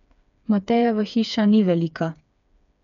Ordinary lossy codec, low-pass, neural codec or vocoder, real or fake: none; 7.2 kHz; codec, 16 kHz, 4 kbps, FreqCodec, smaller model; fake